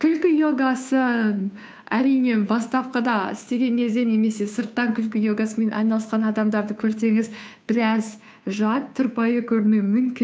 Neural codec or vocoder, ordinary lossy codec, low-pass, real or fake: codec, 16 kHz, 2 kbps, FunCodec, trained on Chinese and English, 25 frames a second; none; none; fake